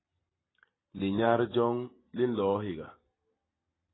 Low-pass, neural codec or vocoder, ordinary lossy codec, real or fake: 7.2 kHz; none; AAC, 16 kbps; real